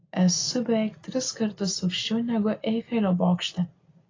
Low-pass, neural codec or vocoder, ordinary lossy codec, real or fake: 7.2 kHz; none; AAC, 32 kbps; real